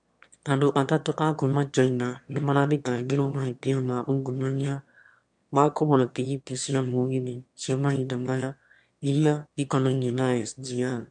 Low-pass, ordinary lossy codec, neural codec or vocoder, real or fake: 9.9 kHz; MP3, 64 kbps; autoencoder, 22.05 kHz, a latent of 192 numbers a frame, VITS, trained on one speaker; fake